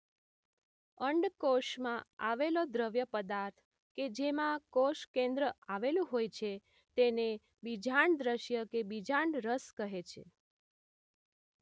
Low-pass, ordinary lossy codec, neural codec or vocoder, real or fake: none; none; none; real